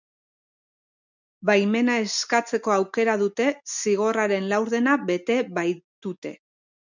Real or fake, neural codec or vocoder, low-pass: real; none; 7.2 kHz